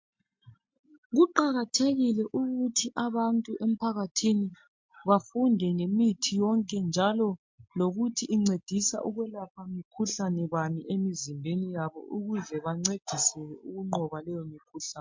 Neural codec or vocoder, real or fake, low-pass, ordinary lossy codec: none; real; 7.2 kHz; MP3, 48 kbps